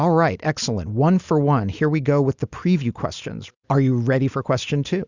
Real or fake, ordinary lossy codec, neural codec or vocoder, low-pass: real; Opus, 64 kbps; none; 7.2 kHz